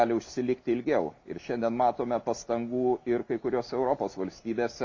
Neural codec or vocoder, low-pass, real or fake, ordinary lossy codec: none; 7.2 kHz; real; MP3, 64 kbps